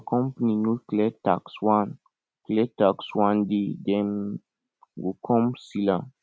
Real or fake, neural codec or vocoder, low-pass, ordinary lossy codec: real; none; none; none